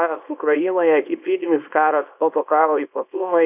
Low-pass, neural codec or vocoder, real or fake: 3.6 kHz; codec, 24 kHz, 0.9 kbps, WavTokenizer, small release; fake